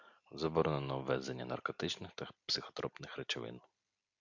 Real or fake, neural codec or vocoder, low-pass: real; none; 7.2 kHz